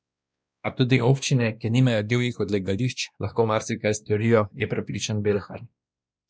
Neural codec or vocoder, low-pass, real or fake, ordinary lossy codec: codec, 16 kHz, 1 kbps, X-Codec, WavLM features, trained on Multilingual LibriSpeech; none; fake; none